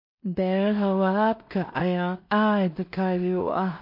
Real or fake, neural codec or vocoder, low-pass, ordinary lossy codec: fake; codec, 16 kHz in and 24 kHz out, 0.4 kbps, LongCat-Audio-Codec, two codebook decoder; 5.4 kHz; MP3, 32 kbps